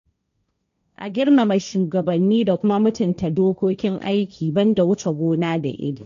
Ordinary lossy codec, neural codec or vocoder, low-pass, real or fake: none; codec, 16 kHz, 1.1 kbps, Voila-Tokenizer; 7.2 kHz; fake